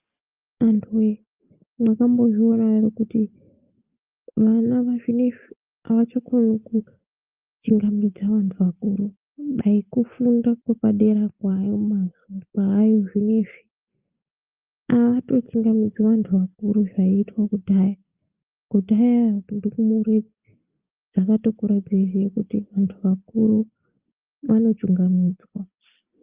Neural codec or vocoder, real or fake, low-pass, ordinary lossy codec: none; real; 3.6 kHz; Opus, 24 kbps